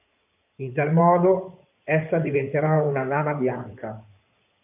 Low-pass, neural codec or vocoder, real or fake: 3.6 kHz; codec, 16 kHz in and 24 kHz out, 2.2 kbps, FireRedTTS-2 codec; fake